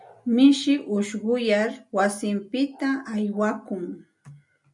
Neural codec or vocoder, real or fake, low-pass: none; real; 10.8 kHz